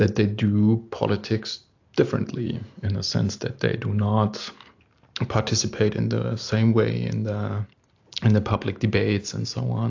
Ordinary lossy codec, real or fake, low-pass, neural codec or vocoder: AAC, 48 kbps; real; 7.2 kHz; none